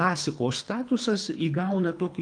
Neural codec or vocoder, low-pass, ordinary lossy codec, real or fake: codec, 24 kHz, 3 kbps, HILCodec; 9.9 kHz; Opus, 32 kbps; fake